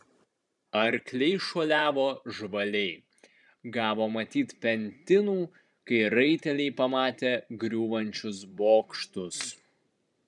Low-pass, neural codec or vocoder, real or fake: 10.8 kHz; vocoder, 44.1 kHz, 128 mel bands every 512 samples, BigVGAN v2; fake